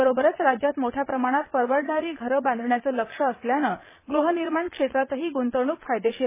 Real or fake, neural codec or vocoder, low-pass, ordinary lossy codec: fake; vocoder, 22.05 kHz, 80 mel bands, Vocos; 3.6 kHz; MP3, 16 kbps